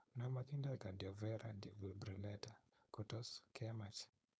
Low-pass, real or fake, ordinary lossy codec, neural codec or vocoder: none; fake; none; codec, 16 kHz, 4.8 kbps, FACodec